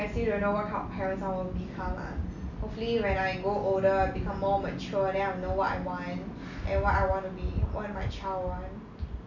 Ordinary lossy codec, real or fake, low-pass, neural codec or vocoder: none; real; 7.2 kHz; none